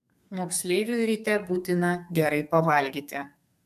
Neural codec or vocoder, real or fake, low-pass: codec, 32 kHz, 1.9 kbps, SNAC; fake; 14.4 kHz